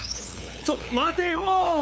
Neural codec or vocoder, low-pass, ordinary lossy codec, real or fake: codec, 16 kHz, 8 kbps, FunCodec, trained on LibriTTS, 25 frames a second; none; none; fake